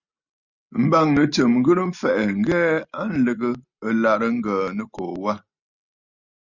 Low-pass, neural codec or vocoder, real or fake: 7.2 kHz; none; real